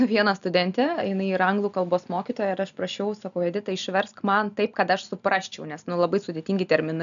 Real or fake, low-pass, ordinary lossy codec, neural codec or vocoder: real; 7.2 kHz; MP3, 96 kbps; none